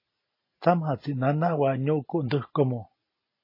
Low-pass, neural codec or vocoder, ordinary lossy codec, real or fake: 5.4 kHz; none; MP3, 24 kbps; real